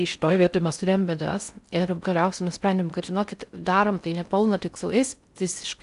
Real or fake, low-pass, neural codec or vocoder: fake; 10.8 kHz; codec, 16 kHz in and 24 kHz out, 0.6 kbps, FocalCodec, streaming, 4096 codes